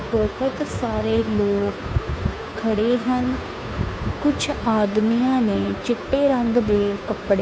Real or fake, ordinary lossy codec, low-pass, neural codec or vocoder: fake; none; none; codec, 16 kHz, 2 kbps, FunCodec, trained on Chinese and English, 25 frames a second